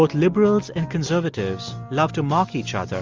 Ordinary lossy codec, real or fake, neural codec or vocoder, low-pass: Opus, 32 kbps; real; none; 7.2 kHz